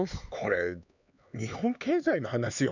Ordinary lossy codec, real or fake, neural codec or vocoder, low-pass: none; fake; codec, 16 kHz, 4 kbps, X-Codec, HuBERT features, trained on LibriSpeech; 7.2 kHz